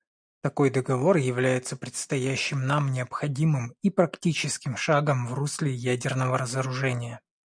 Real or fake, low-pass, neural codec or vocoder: real; 9.9 kHz; none